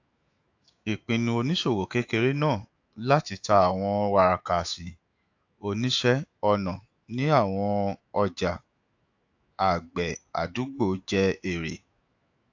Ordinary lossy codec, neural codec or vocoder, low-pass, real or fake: AAC, 48 kbps; autoencoder, 48 kHz, 128 numbers a frame, DAC-VAE, trained on Japanese speech; 7.2 kHz; fake